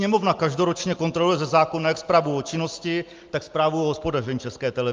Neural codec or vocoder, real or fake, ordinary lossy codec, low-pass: none; real; Opus, 32 kbps; 7.2 kHz